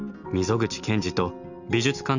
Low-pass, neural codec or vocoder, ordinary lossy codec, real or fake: 7.2 kHz; none; none; real